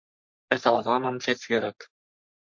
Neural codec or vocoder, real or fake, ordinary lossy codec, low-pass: codec, 44.1 kHz, 3.4 kbps, Pupu-Codec; fake; MP3, 48 kbps; 7.2 kHz